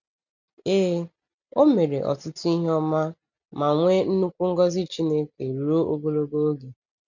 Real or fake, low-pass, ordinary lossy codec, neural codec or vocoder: real; 7.2 kHz; none; none